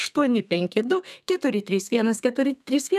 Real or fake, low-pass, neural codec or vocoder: fake; 14.4 kHz; codec, 32 kHz, 1.9 kbps, SNAC